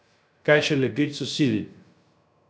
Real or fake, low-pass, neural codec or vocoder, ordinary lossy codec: fake; none; codec, 16 kHz, 0.3 kbps, FocalCodec; none